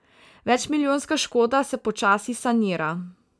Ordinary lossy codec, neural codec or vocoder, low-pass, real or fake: none; none; none; real